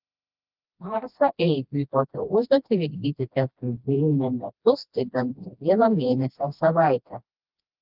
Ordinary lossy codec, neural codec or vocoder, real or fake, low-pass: Opus, 32 kbps; codec, 16 kHz, 1 kbps, FreqCodec, smaller model; fake; 5.4 kHz